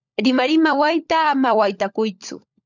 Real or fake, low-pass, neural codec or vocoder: fake; 7.2 kHz; codec, 16 kHz, 16 kbps, FunCodec, trained on LibriTTS, 50 frames a second